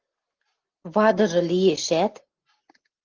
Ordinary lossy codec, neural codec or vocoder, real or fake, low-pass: Opus, 16 kbps; none; real; 7.2 kHz